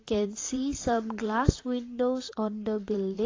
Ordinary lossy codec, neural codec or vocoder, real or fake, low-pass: AAC, 32 kbps; vocoder, 44.1 kHz, 128 mel bands, Pupu-Vocoder; fake; 7.2 kHz